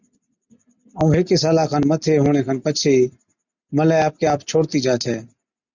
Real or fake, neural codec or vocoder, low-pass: real; none; 7.2 kHz